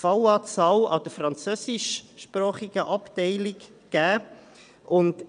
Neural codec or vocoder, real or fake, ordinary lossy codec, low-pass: vocoder, 22.05 kHz, 80 mel bands, WaveNeXt; fake; none; 9.9 kHz